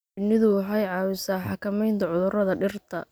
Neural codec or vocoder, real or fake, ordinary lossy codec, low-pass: none; real; none; none